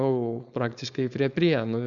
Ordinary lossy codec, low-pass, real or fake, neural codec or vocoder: Opus, 64 kbps; 7.2 kHz; fake; codec, 16 kHz, 4.8 kbps, FACodec